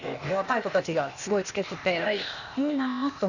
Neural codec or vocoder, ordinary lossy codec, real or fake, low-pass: codec, 16 kHz, 0.8 kbps, ZipCodec; none; fake; 7.2 kHz